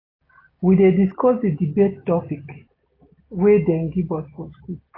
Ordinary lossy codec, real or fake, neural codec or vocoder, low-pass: AAC, 24 kbps; real; none; 5.4 kHz